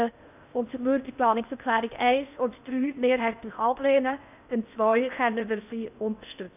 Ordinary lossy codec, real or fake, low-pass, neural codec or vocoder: none; fake; 3.6 kHz; codec, 16 kHz in and 24 kHz out, 0.6 kbps, FocalCodec, streaming, 4096 codes